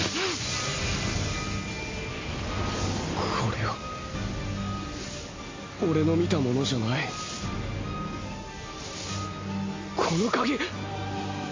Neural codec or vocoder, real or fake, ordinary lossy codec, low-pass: none; real; MP3, 32 kbps; 7.2 kHz